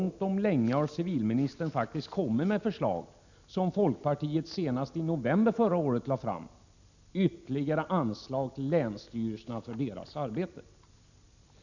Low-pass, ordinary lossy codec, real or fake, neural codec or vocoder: 7.2 kHz; none; real; none